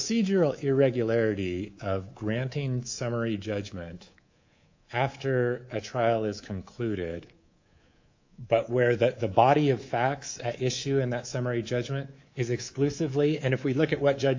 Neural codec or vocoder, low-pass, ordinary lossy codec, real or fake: codec, 24 kHz, 3.1 kbps, DualCodec; 7.2 kHz; AAC, 48 kbps; fake